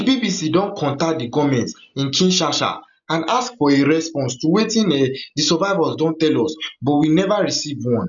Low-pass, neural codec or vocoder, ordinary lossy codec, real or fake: 7.2 kHz; none; none; real